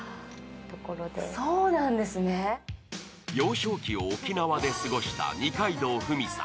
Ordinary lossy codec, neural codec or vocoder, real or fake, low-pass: none; none; real; none